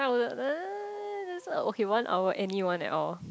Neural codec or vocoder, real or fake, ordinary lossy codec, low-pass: none; real; none; none